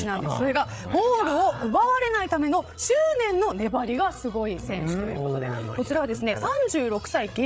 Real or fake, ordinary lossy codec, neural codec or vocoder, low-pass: fake; none; codec, 16 kHz, 8 kbps, FreqCodec, larger model; none